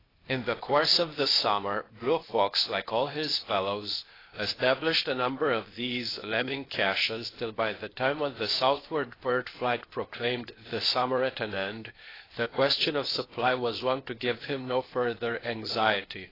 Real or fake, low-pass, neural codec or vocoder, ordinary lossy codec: fake; 5.4 kHz; codec, 16 kHz, 0.8 kbps, ZipCodec; AAC, 24 kbps